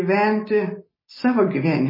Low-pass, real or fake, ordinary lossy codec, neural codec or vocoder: 5.4 kHz; real; MP3, 24 kbps; none